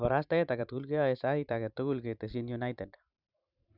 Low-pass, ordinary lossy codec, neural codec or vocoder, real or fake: 5.4 kHz; none; vocoder, 44.1 kHz, 128 mel bands every 512 samples, BigVGAN v2; fake